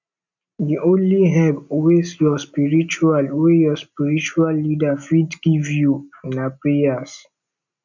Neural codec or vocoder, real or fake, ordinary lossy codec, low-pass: none; real; none; 7.2 kHz